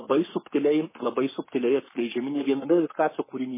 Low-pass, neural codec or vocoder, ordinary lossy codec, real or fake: 3.6 kHz; codec, 44.1 kHz, 7.8 kbps, Pupu-Codec; MP3, 16 kbps; fake